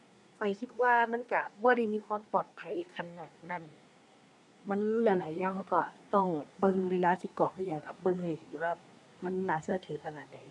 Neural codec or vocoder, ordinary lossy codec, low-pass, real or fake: codec, 24 kHz, 1 kbps, SNAC; none; 10.8 kHz; fake